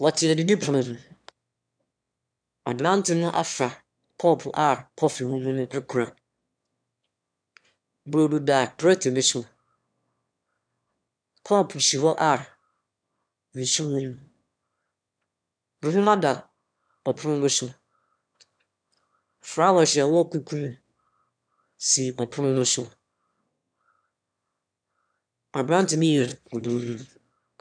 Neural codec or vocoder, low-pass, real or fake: autoencoder, 22.05 kHz, a latent of 192 numbers a frame, VITS, trained on one speaker; 9.9 kHz; fake